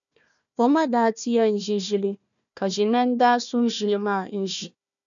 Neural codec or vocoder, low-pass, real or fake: codec, 16 kHz, 1 kbps, FunCodec, trained on Chinese and English, 50 frames a second; 7.2 kHz; fake